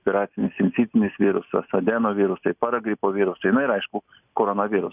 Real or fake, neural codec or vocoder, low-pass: real; none; 3.6 kHz